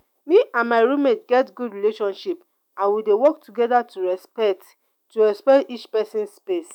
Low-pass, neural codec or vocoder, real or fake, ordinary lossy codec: 19.8 kHz; autoencoder, 48 kHz, 128 numbers a frame, DAC-VAE, trained on Japanese speech; fake; none